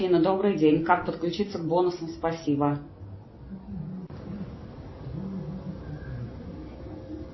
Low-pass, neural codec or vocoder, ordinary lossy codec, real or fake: 7.2 kHz; none; MP3, 24 kbps; real